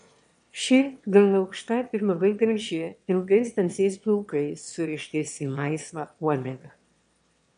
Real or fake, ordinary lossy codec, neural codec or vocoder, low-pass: fake; MP3, 64 kbps; autoencoder, 22.05 kHz, a latent of 192 numbers a frame, VITS, trained on one speaker; 9.9 kHz